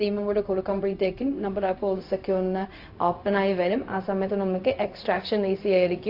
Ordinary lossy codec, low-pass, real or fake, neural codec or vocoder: AAC, 32 kbps; 5.4 kHz; fake; codec, 16 kHz, 0.4 kbps, LongCat-Audio-Codec